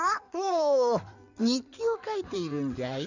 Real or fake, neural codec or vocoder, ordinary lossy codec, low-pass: fake; codec, 16 kHz in and 24 kHz out, 2.2 kbps, FireRedTTS-2 codec; none; 7.2 kHz